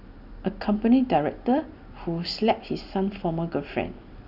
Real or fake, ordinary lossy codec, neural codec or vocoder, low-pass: real; none; none; 5.4 kHz